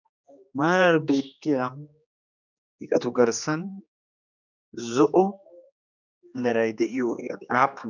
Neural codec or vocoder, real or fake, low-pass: codec, 16 kHz, 2 kbps, X-Codec, HuBERT features, trained on general audio; fake; 7.2 kHz